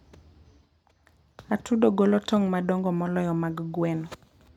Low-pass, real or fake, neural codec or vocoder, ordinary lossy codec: 19.8 kHz; real; none; none